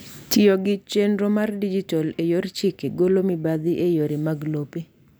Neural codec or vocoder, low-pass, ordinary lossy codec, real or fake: none; none; none; real